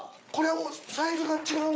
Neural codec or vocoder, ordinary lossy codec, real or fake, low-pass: codec, 16 kHz, 8 kbps, FreqCodec, larger model; none; fake; none